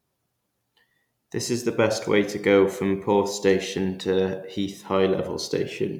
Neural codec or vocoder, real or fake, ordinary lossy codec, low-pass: none; real; none; 19.8 kHz